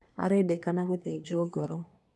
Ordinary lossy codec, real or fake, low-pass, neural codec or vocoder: none; fake; none; codec, 24 kHz, 1 kbps, SNAC